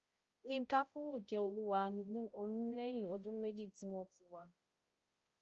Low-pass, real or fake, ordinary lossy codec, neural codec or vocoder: 7.2 kHz; fake; Opus, 24 kbps; codec, 16 kHz, 0.5 kbps, X-Codec, HuBERT features, trained on balanced general audio